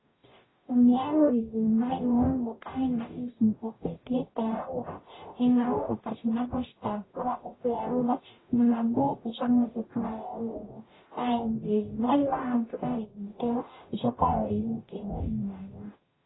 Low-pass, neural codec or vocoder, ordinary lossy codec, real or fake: 7.2 kHz; codec, 44.1 kHz, 0.9 kbps, DAC; AAC, 16 kbps; fake